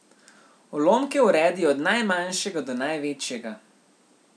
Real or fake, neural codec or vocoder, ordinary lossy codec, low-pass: real; none; none; none